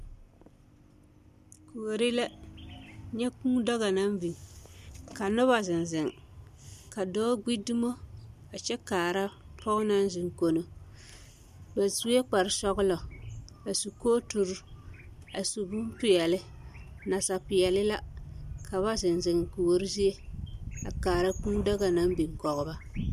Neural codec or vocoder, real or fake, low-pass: none; real; 14.4 kHz